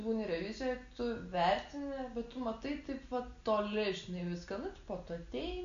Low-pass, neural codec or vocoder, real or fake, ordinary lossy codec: 7.2 kHz; none; real; MP3, 64 kbps